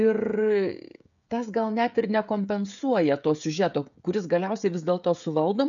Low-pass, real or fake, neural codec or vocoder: 7.2 kHz; fake; codec, 16 kHz, 16 kbps, FreqCodec, smaller model